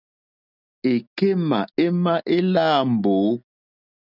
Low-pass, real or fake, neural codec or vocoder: 5.4 kHz; real; none